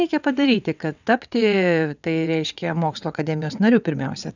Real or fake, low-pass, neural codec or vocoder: fake; 7.2 kHz; vocoder, 22.05 kHz, 80 mel bands, WaveNeXt